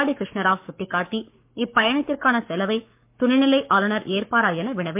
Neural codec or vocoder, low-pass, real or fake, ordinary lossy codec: codec, 44.1 kHz, 7.8 kbps, Pupu-Codec; 3.6 kHz; fake; MP3, 32 kbps